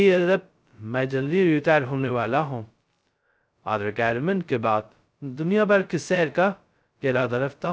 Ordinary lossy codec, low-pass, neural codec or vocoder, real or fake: none; none; codec, 16 kHz, 0.2 kbps, FocalCodec; fake